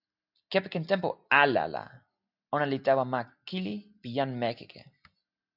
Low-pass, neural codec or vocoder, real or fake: 5.4 kHz; none; real